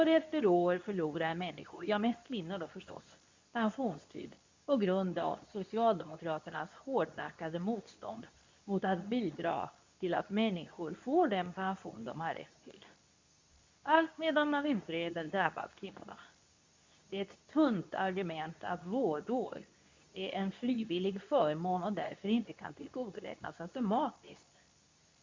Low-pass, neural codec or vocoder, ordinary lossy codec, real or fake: 7.2 kHz; codec, 24 kHz, 0.9 kbps, WavTokenizer, medium speech release version 2; MP3, 64 kbps; fake